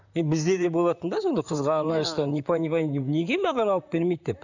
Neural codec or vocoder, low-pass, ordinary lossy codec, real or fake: vocoder, 44.1 kHz, 128 mel bands, Pupu-Vocoder; 7.2 kHz; none; fake